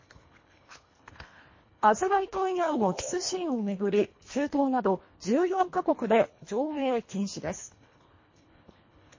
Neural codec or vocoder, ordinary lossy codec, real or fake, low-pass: codec, 24 kHz, 1.5 kbps, HILCodec; MP3, 32 kbps; fake; 7.2 kHz